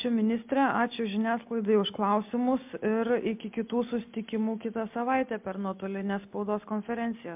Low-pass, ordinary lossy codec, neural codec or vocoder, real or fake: 3.6 kHz; MP3, 32 kbps; none; real